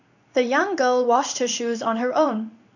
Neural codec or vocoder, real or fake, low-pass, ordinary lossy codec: codec, 16 kHz in and 24 kHz out, 1 kbps, XY-Tokenizer; fake; 7.2 kHz; none